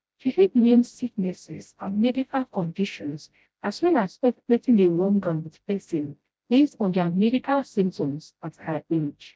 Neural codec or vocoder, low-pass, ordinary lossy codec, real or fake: codec, 16 kHz, 0.5 kbps, FreqCodec, smaller model; none; none; fake